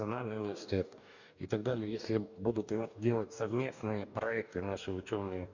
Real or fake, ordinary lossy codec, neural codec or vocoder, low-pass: fake; none; codec, 44.1 kHz, 2.6 kbps, DAC; 7.2 kHz